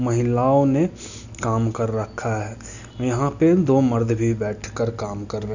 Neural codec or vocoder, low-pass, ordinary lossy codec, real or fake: none; 7.2 kHz; none; real